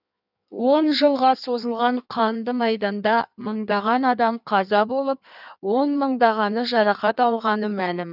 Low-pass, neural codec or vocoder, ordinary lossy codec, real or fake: 5.4 kHz; codec, 16 kHz in and 24 kHz out, 1.1 kbps, FireRedTTS-2 codec; none; fake